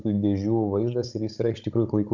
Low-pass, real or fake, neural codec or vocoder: 7.2 kHz; fake; codec, 16 kHz, 16 kbps, FunCodec, trained on Chinese and English, 50 frames a second